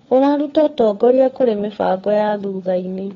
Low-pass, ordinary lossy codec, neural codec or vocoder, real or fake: 7.2 kHz; AAC, 32 kbps; codec, 16 kHz, 4 kbps, FunCodec, trained on LibriTTS, 50 frames a second; fake